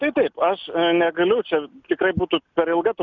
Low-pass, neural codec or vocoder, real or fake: 7.2 kHz; none; real